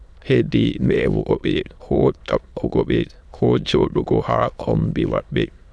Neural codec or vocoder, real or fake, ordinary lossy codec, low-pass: autoencoder, 22.05 kHz, a latent of 192 numbers a frame, VITS, trained on many speakers; fake; none; none